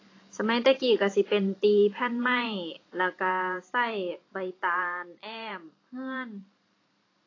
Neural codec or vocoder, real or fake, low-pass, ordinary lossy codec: vocoder, 44.1 kHz, 128 mel bands every 512 samples, BigVGAN v2; fake; 7.2 kHz; AAC, 32 kbps